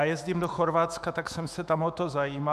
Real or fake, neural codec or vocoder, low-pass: fake; autoencoder, 48 kHz, 128 numbers a frame, DAC-VAE, trained on Japanese speech; 14.4 kHz